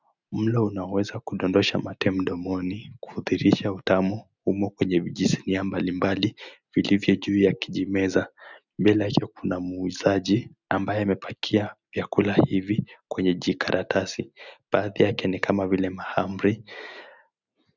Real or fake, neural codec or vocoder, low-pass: real; none; 7.2 kHz